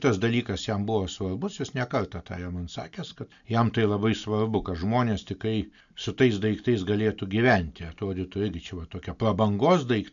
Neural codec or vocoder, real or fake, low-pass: none; real; 7.2 kHz